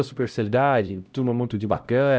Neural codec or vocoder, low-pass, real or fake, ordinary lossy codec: codec, 16 kHz, 0.5 kbps, X-Codec, HuBERT features, trained on LibriSpeech; none; fake; none